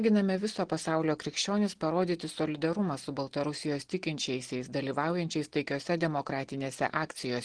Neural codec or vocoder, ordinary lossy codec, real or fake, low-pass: none; Opus, 16 kbps; real; 9.9 kHz